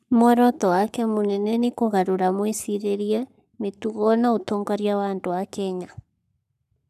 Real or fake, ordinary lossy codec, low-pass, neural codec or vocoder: fake; none; 14.4 kHz; codec, 44.1 kHz, 7.8 kbps, Pupu-Codec